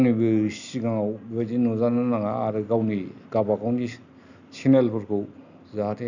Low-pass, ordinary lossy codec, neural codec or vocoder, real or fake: 7.2 kHz; none; none; real